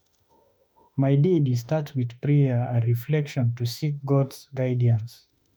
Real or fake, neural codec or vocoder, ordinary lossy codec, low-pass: fake; autoencoder, 48 kHz, 32 numbers a frame, DAC-VAE, trained on Japanese speech; none; none